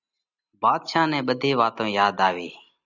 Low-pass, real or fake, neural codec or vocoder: 7.2 kHz; real; none